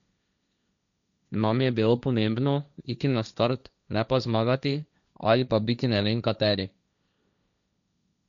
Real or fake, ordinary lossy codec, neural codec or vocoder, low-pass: fake; MP3, 96 kbps; codec, 16 kHz, 1.1 kbps, Voila-Tokenizer; 7.2 kHz